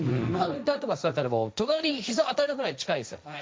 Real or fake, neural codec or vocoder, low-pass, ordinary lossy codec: fake; codec, 16 kHz, 1.1 kbps, Voila-Tokenizer; none; none